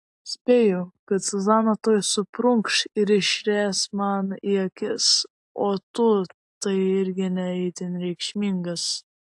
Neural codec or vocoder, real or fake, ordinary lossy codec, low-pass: none; real; AAC, 64 kbps; 10.8 kHz